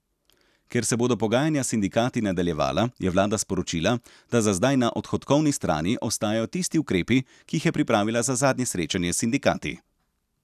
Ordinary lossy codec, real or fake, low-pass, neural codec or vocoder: none; real; 14.4 kHz; none